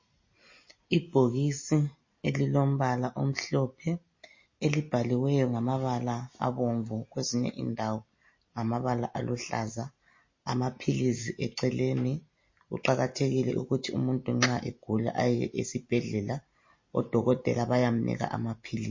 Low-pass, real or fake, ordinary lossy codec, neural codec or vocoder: 7.2 kHz; real; MP3, 32 kbps; none